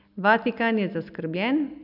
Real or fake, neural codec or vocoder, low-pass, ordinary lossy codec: real; none; 5.4 kHz; none